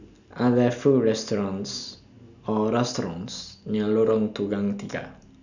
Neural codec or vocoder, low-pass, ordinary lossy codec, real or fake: none; 7.2 kHz; none; real